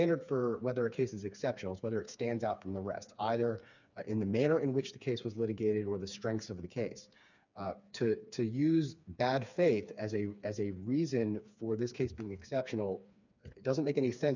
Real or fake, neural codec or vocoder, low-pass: fake; codec, 16 kHz, 4 kbps, FreqCodec, smaller model; 7.2 kHz